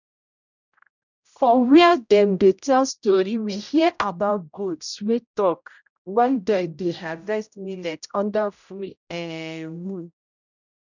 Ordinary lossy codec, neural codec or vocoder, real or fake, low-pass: none; codec, 16 kHz, 0.5 kbps, X-Codec, HuBERT features, trained on general audio; fake; 7.2 kHz